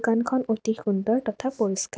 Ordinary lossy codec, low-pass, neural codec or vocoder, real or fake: none; none; none; real